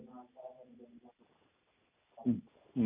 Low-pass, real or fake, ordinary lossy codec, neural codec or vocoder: 3.6 kHz; real; none; none